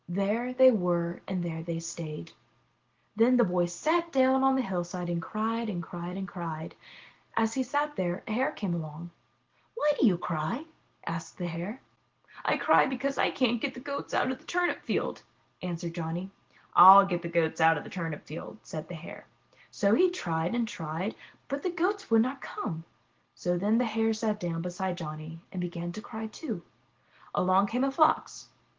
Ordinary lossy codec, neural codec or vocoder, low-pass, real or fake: Opus, 16 kbps; none; 7.2 kHz; real